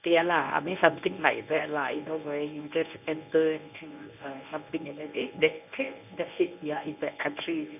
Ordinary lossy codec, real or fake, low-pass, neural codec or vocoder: none; fake; 3.6 kHz; codec, 24 kHz, 0.9 kbps, WavTokenizer, medium speech release version 1